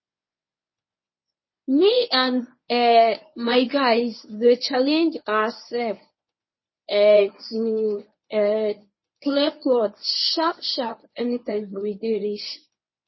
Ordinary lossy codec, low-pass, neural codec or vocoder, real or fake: MP3, 24 kbps; 7.2 kHz; codec, 24 kHz, 0.9 kbps, WavTokenizer, medium speech release version 1; fake